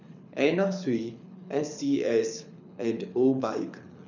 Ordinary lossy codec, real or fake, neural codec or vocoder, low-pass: none; fake; codec, 24 kHz, 6 kbps, HILCodec; 7.2 kHz